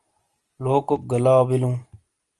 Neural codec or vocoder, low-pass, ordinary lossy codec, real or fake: none; 10.8 kHz; Opus, 24 kbps; real